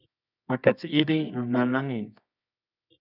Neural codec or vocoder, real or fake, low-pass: codec, 24 kHz, 0.9 kbps, WavTokenizer, medium music audio release; fake; 5.4 kHz